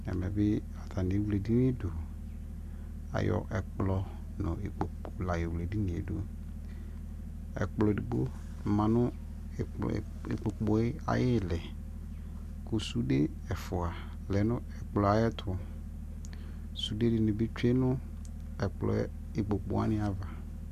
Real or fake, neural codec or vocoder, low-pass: real; none; 14.4 kHz